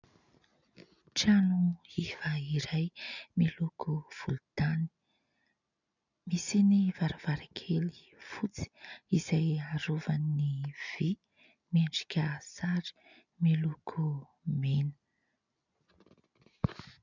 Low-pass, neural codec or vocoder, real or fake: 7.2 kHz; none; real